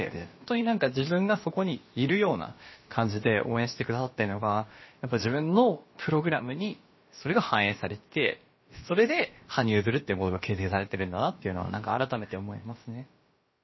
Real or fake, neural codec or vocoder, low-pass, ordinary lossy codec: fake; codec, 16 kHz, about 1 kbps, DyCAST, with the encoder's durations; 7.2 kHz; MP3, 24 kbps